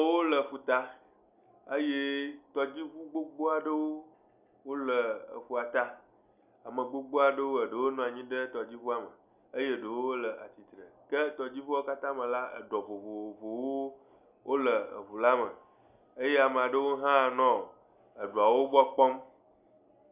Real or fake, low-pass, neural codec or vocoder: real; 3.6 kHz; none